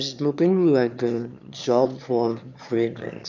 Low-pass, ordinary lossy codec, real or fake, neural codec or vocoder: 7.2 kHz; none; fake; autoencoder, 22.05 kHz, a latent of 192 numbers a frame, VITS, trained on one speaker